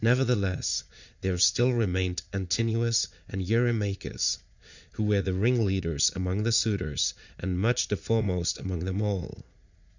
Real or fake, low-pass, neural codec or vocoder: fake; 7.2 kHz; vocoder, 44.1 kHz, 80 mel bands, Vocos